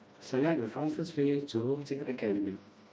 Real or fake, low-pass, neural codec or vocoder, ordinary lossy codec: fake; none; codec, 16 kHz, 1 kbps, FreqCodec, smaller model; none